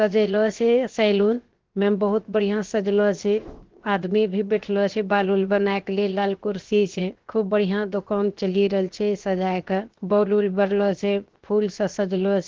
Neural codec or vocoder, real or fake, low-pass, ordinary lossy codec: codec, 16 kHz, 0.7 kbps, FocalCodec; fake; 7.2 kHz; Opus, 16 kbps